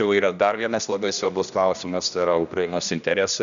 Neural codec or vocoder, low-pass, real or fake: codec, 16 kHz, 1 kbps, X-Codec, HuBERT features, trained on general audio; 7.2 kHz; fake